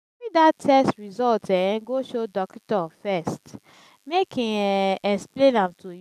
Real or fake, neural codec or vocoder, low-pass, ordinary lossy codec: real; none; 14.4 kHz; none